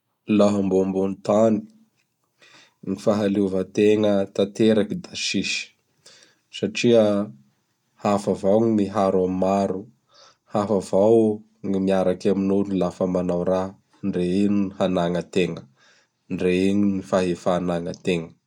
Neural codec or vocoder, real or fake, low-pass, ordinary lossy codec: none; real; 19.8 kHz; none